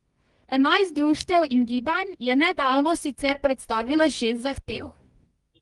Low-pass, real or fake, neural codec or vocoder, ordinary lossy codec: 10.8 kHz; fake; codec, 24 kHz, 0.9 kbps, WavTokenizer, medium music audio release; Opus, 24 kbps